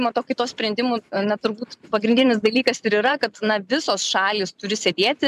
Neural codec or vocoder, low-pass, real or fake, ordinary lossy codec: vocoder, 44.1 kHz, 128 mel bands every 256 samples, BigVGAN v2; 14.4 kHz; fake; MP3, 96 kbps